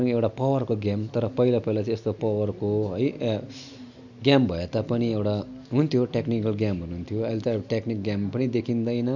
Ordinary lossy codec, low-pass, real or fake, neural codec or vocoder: none; 7.2 kHz; real; none